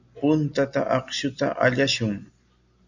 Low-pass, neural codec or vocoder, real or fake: 7.2 kHz; none; real